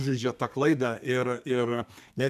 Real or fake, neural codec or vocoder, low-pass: fake; codec, 32 kHz, 1.9 kbps, SNAC; 14.4 kHz